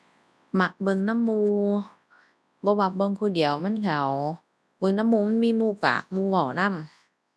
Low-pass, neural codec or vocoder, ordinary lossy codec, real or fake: none; codec, 24 kHz, 0.9 kbps, WavTokenizer, large speech release; none; fake